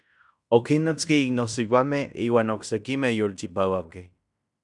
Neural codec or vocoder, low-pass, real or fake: codec, 16 kHz in and 24 kHz out, 0.9 kbps, LongCat-Audio-Codec, fine tuned four codebook decoder; 10.8 kHz; fake